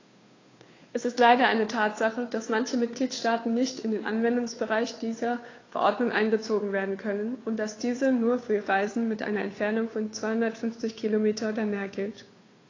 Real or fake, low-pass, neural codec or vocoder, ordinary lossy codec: fake; 7.2 kHz; codec, 16 kHz, 2 kbps, FunCodec, trained on Chinese and English, 25 frames a second; AAC, 32 kbps